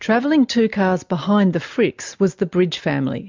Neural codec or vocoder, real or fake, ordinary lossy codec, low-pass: none; real; MP3, 64 kbps; 7.2 kHz